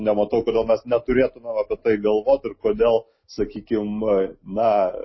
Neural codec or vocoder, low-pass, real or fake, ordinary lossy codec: none; 7.2 kHz; real; MP3, 24 kbps